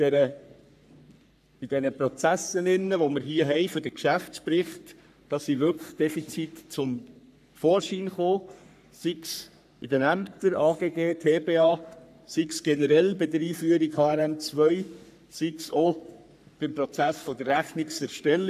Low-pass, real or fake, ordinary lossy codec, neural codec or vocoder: 14.4 kHz; fake; none; codec, 44.1 kHz, 3.4 kbps, Pupu-Codec